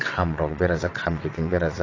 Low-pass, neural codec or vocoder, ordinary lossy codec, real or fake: 7.2 kHz; vocoder, 22.05 kHz, 80 mel bands, WaveNeXt; AAC, 32 kbps; fake